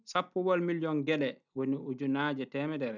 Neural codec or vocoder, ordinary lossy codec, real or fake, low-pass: none; none; real; 7.2 kHz